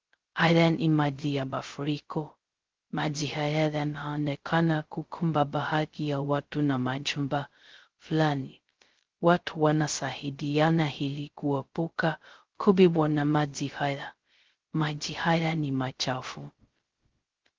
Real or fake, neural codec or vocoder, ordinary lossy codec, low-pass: fake; codec, 16 kHz, 0.2 kbps, FocalCodec; Opus, 16 kbps; 7.2 kHz